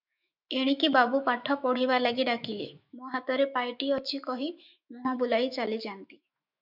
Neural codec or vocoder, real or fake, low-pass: autoencoder, 48 kHz, 128 numbers a frame, DAC-VAE, trained on Japanese speech; fake; 5.4 kHz